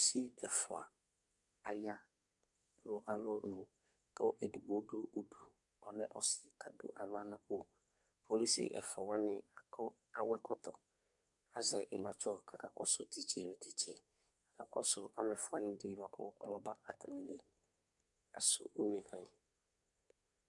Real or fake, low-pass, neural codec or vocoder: fake; 10.8 kHz; codec, 24 kHz, 1 kbps, SNAC